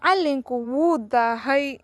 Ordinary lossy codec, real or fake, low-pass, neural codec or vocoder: none; fake; none; vocoder, 24 kHz, 100 mel bands, Vocos